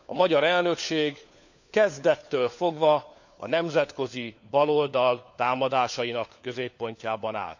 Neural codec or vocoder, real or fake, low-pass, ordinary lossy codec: codec, 16 kHz, 4 kbps, FunCodec, trained on LibriTTS, 50 frames a second; fake; 7.2 kHz; none